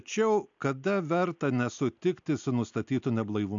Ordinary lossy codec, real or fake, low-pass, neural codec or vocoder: AAC, 64 kbps; real; 7.2 kHz; none